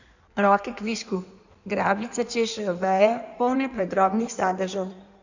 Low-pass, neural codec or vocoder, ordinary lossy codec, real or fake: 7.2 kHz; codec, 16 kHz in and 24 kHz out, 1.1 kbps, FireRedTTS-2 codec; none; fake